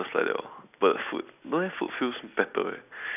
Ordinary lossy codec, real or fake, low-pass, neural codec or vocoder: none; real; 3.6 kHz; none